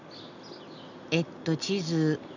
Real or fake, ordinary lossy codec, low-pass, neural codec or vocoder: real; none; 7.2 kHz; none